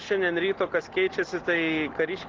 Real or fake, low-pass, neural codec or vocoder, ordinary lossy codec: real; 7.2 kHz; none; Opus, 16 kbps